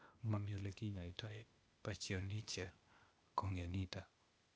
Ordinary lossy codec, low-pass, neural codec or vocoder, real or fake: none; none; codec, 16 kHz, 0.8 kbps, ZipCodec; fake